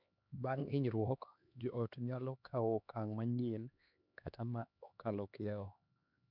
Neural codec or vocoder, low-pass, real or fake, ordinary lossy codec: codec, 16 kHz, 2 kbps, X-Codec, HuBERT features, trained on LibriSpeech; 5.4 kHz; fake; none